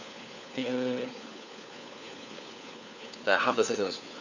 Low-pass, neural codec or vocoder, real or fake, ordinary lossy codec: 7.2 kHz; codec, 16 kHz, 4 kbps, FunCodec, trained on LibriTTS, 50 frames a second; fake; none